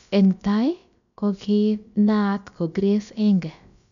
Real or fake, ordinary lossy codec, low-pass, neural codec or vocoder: fake; none; 7.2 kHz; codec, 16 kHz, about 1 kbps, DyCAST, with the encoder's durations